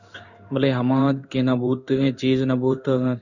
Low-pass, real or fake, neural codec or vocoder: 7.2 kHz; fake; codec, 16 kHz in and 24 kHz out, 1 kbps, XY-Tokenizer